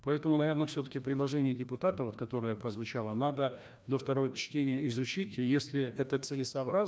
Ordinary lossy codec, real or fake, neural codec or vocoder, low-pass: none; fake; codec, 16 kHz, 1 kbps, FreqCodec, larger model; none